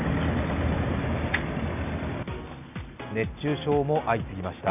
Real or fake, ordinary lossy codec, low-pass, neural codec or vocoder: real; none; 3.6 kHz; none